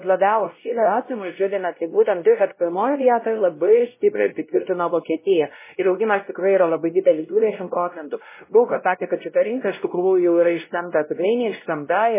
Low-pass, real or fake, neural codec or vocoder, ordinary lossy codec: 3.6 kHz; fake; codec, 16 kHz, 0.5 kbps, X-Codec, WavLM features, trained on Multilingual LibriSpeech; MP3, 16 kbps